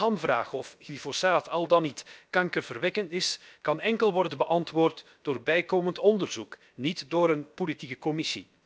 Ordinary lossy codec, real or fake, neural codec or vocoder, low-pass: none; fake; codec, 16 kHz, about 1 kbps, DyCAST, with the encoder's durations; none